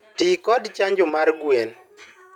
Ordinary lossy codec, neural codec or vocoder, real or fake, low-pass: none; vocoder, 48 kHz, 128 mel bands, Vocos; fake; 19.8 kHz